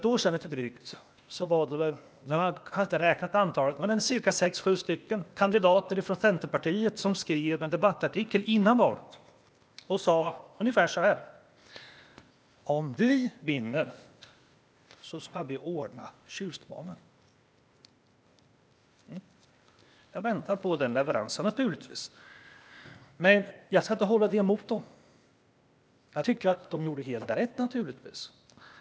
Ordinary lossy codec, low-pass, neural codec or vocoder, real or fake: none; none; codec, 16 kHz, 0.8 kbps, ZipCodec; fake